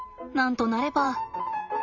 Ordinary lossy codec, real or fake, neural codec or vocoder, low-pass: none; fake; vocoder, 44.1 kHz, 128 mel bands every 512 samples, BigVGAN v2; 7.2 kHz